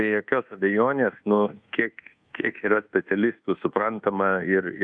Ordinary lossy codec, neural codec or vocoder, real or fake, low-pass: Opus, 64 kbps; codec, 24 kHz, 1.2 kbps, DualCodec; fake; 9.9 kHz